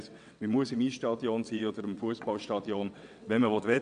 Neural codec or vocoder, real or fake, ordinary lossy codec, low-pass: vocoder, 22.05 kHz, 80 mel bands, WaveNeXt; fake; none; 9.9 kHz